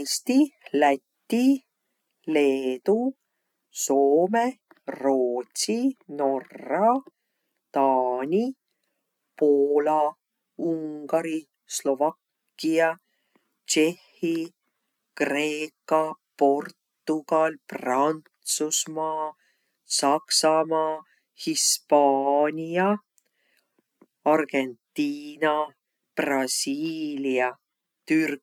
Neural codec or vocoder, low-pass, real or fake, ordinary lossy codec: none; 19.8 kHz; real; none